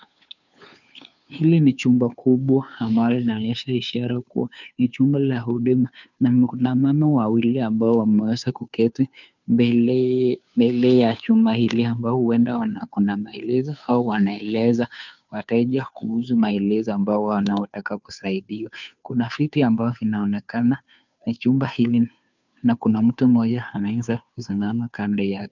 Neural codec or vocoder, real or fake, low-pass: codec, 16 kHz, 2 kbps, FunCodec, trained on Chinese and English, 25 frames a second; fake; 7.2 kHz